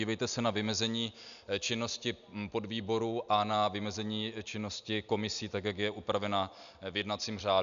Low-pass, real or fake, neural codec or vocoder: 7.2 kHz; real; none